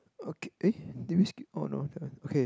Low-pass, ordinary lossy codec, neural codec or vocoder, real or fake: none; none; none; real